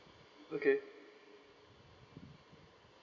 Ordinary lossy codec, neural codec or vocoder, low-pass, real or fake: none; none; 7.2 kHz; real